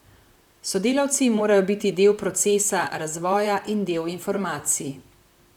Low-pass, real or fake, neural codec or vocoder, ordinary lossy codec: 19.8 kHz; fake; vocoder, 44.1 kHz, 128 mel bands, Pupu-Vocoder; none